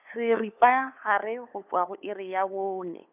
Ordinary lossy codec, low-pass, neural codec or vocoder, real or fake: none; 3.6 kHz; codec, 16 kHz, 8 kbps, FunCodec, trained on LibriTTS, 25 frames a second; fake